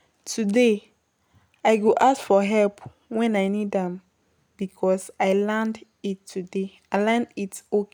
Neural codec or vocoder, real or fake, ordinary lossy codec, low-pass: none; real; none; none